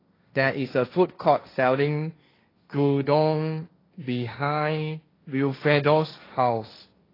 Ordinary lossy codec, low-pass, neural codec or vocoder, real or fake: AAC, 24 kbps; 5.4 kHz; codec, 16 kHz, 1.1 kbps, Voila-Tokenizer; fake